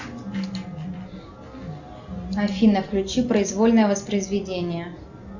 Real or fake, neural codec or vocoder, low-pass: real; none; 7.2 kHz